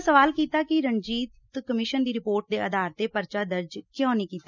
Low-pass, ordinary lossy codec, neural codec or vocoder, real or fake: 7.2 kHz; none; none; real